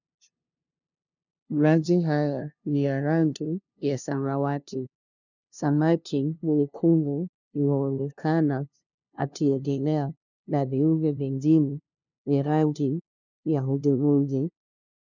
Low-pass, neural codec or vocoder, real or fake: 7.2 kHz; codec, 16 kHz, 0.5 kbps, FunCodec, trained on LibriTTS, 25 frames a second; fake